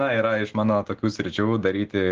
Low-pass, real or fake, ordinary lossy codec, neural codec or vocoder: 7.2 kHz; real; Opus, 16 kbps; none